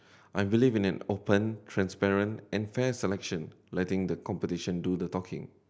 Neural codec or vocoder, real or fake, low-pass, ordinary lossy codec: none; real; none; none